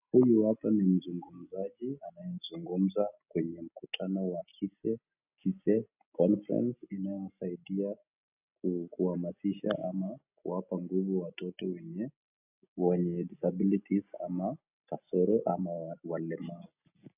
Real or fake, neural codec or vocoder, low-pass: real; none; 3.6 kHz